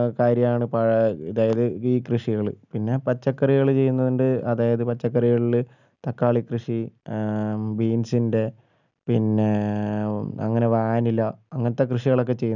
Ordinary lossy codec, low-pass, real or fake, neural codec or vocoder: none; 7.2 kHz; real; none